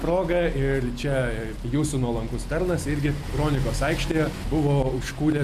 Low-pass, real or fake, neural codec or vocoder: 14.4 kHz; real; none